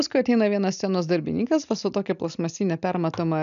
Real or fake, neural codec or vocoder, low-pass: real; none; 7.2 kHz